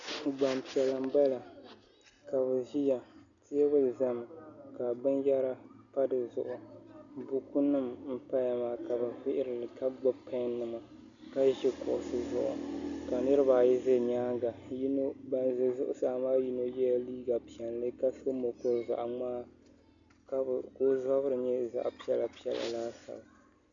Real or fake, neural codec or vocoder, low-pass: real; none; 7.2 kHz